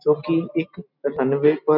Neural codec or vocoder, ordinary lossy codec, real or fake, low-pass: none; none; real; 5.4 kHz